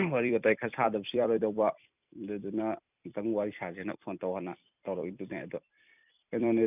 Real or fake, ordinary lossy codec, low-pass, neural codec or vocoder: fake; none; 3.6 kHz; vocoder, 44.1 kHz, 128 mel bands every 256 samples, BigVGAN v2